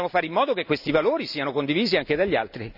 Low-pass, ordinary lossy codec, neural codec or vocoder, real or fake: 5.4 kHz; none; none; real